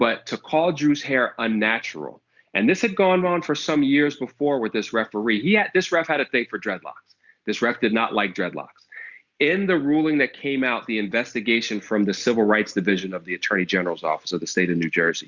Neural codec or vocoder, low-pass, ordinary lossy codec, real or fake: none; 7.2 kHz; Opus, 64 kbps; real